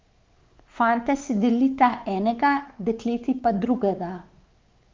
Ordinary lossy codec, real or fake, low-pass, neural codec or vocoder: Opus, 32 kbps; fake; 7.2 kHz; codec, 16 kHz, 4 kbps, X-Codec, WavLM features, trained on Multilingual LibriSpeech